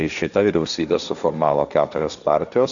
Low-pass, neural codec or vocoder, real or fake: 7.2 kHz; codec, 16 kHz, 1.1 kbps, Voila-Tokenizer; fake